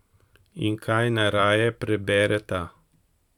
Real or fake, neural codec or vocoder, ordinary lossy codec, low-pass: fake; vocoder, 44.1 kHz, 128 mel bands every 512 samples, BigVGAN v2; none; 19.8 kHz